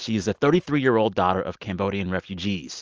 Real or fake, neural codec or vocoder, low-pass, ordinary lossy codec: real; none; 7.2 kHz; Opus, 24 kbps